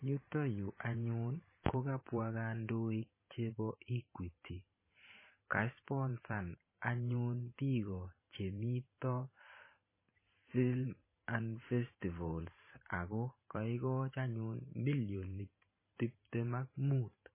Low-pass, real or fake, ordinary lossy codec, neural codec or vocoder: 3.6 kHz; fake; MP3, 16 kbps; vocoder, 44.1 kHz, 128 mel bands every 512 samples, BigVGAN v2